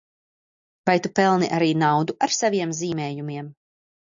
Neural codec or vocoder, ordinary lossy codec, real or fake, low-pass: none; AAC, 64 kbps; real; 7.2 kHz